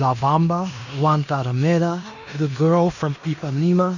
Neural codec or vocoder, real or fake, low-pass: codec, 16 kHz in and 24 kHz out, 0.9 kbps, LongCat-Audio-Codec, fine tuned four codebook decoder; fake; 7.2 kHz